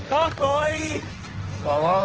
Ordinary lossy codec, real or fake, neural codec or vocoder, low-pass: Opus, 16 kbps; fake; codec, 16 kHz, 1.1 kbps, Voila-Tokenizer; 7.2 kHz